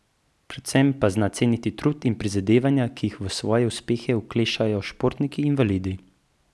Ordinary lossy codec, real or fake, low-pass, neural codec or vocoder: none; real; none; none